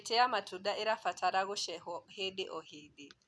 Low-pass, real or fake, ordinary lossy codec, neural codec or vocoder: none; real; none; none